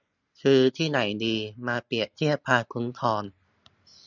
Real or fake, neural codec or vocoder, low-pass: real; none; 7.2 kHz